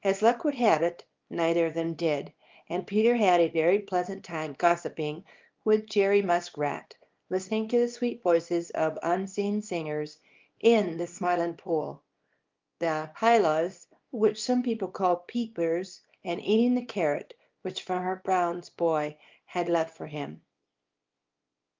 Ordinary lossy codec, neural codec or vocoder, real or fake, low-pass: Opus, 32 kbps; codec, 24 kHz, 0.9 kbps, WavTokenizer, small release; fake; 7.2 kHz